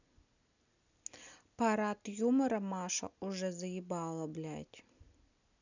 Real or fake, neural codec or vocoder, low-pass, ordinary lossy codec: real; none; 7.2 kHz; none